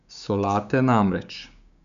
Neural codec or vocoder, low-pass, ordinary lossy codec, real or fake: codec, 16 kHz, 6 kbps, DAC; 7.2 kHz; none; fake